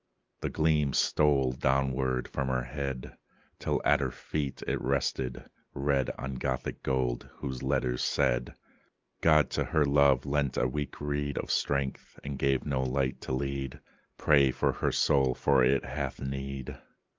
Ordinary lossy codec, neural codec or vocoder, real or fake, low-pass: Opus, 32 kbps; none; real; 7.2 kHz